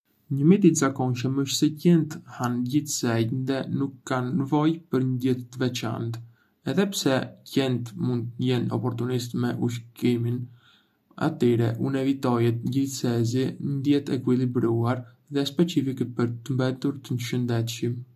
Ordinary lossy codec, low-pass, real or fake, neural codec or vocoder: none; 14.4 kHz; real; none